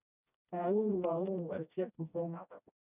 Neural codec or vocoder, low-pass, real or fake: codec, 16 kHz, 1 kbps, FreqCodec, smaller model; 3.6 kHz; fake